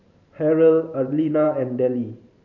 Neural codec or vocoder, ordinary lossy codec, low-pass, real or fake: vocoder, 44.1 kHz, 128 mel bands every 512 samples, BigVGAN v2; none; 7.2 kHz; fake